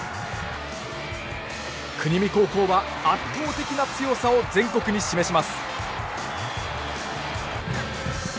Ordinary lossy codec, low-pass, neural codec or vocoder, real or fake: none; none; none; real